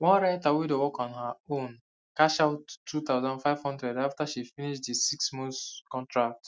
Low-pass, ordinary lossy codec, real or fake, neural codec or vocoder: none; none; real; none